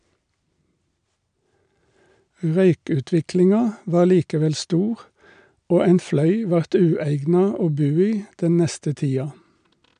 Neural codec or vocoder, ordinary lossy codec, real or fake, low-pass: none; none; real; 9.9 kHz